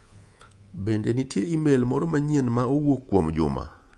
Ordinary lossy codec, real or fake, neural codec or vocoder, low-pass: Opus, 32 kbps; fake; codec, 24 kHz, 3.1 kbps, DualCodec; 10.8 kHz